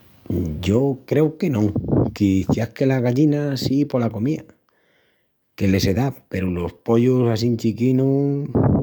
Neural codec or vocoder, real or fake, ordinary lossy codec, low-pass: codec, 44.1 kHz, 7.8 kbps, DAC; fake; none; none